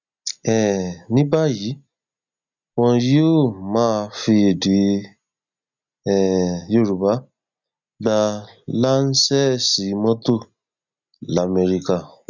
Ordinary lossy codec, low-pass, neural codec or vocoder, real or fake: none; 7.2 kHz; none; real